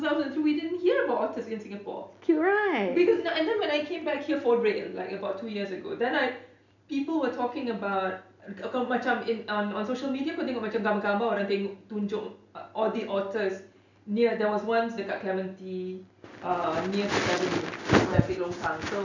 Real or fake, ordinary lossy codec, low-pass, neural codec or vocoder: real; none; 7.2 kHz; none